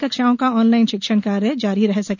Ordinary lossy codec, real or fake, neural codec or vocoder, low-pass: none; real; none; 7.2 kHz